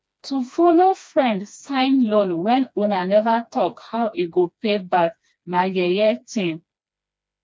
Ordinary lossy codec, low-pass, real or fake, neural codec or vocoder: none; none; fake; codec, 16 kHz, 2 kbps, FreqCodec, smaller model